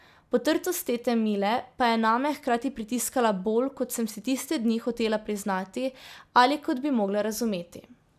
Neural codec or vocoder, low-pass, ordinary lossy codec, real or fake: none; 14.4 kHz; none; real